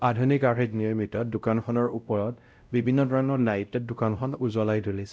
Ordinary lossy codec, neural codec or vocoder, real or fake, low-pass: none; codec, 16 kHz, 0.5 kbps, X-Codec, WavLM features, trained on Multilingual LibriSpeech; fake; none